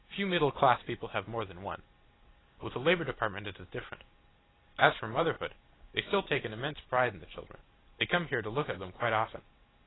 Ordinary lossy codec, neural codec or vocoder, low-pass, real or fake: AAC, 16 kbps; none; 7.2 kHz; real